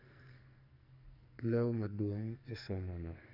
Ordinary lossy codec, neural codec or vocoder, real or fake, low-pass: none; codec, 44.1 kHz, 2.6 kbps, SNAC; fake; 5.4 kHz